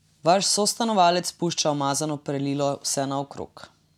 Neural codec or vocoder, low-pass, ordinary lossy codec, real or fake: none; 19.8 kHz; none; real